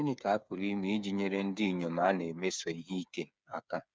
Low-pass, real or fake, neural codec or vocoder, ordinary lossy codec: none; fake; codec, 16 kHz, 8 kbps, FreqCodec, smaller model; none